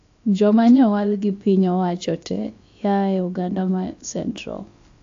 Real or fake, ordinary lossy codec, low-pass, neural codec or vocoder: fake; AAC, 48 kbps; 7.2 kHz; codec, 16 kHz, about 1 kbps, DyCAST, with the encoder's durations